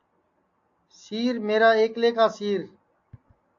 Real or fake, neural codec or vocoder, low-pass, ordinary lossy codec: real; none; 7.2 kHz; AAC, 48 kbps